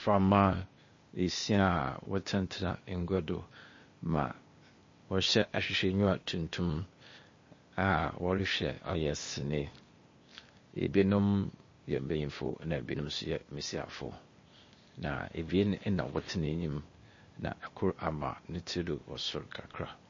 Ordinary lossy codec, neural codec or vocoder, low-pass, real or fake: MP3, 32 kbps; codec, 16 kHz, 0.8 kbps, ZipCodec; 7.2 kHz; fake